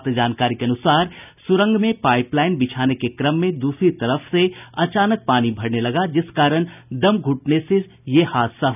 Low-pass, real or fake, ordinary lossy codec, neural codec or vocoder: 3.6 kHz; real; none; none